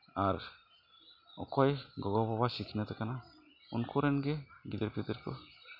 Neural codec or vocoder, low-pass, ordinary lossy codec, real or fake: none; 5.4 kHz; none; real